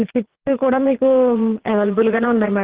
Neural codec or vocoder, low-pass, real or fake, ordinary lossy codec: vocoder, 22.05 kHz, 80 mel bands, Vocos; 3.6 kHz; fake; Opus, 16 kbps